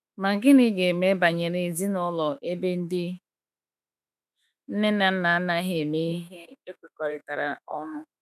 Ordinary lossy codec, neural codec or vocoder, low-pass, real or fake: none; autoencoder, 48 kHz, 32 numbers a frame, DAC-VAE, trained on Japanese speech; 14.4 kHz; fake